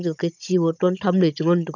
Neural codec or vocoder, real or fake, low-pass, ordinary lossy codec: codec, 16 kHz, 16 kbps, FunCodec, trained on Chinese and English, 50 frames a second; fake; 7.2 kHz; none